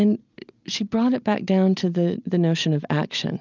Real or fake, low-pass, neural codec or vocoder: real; 7.2 kHz; none